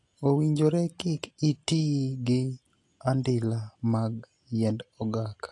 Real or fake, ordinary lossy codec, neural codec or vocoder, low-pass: real; AAC, 48 kbps; none; 10.8 kHz